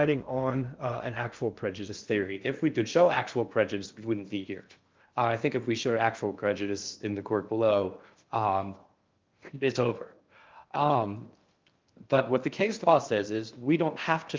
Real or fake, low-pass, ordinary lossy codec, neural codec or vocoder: fake; 7.2 kHz; Opus, 32 kbps; codec, 16 kHz in and 24 kHz out, 0.6 kbps, FocalCodec, streaming, 2048 codes